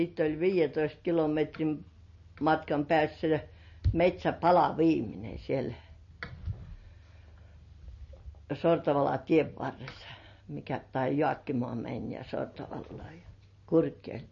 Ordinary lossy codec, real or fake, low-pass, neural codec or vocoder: MP3, 32 kbps; real; 7.2 kHz; none